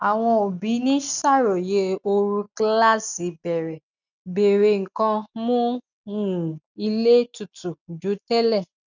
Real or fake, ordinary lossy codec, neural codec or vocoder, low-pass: real; none; none; 7.2 kHz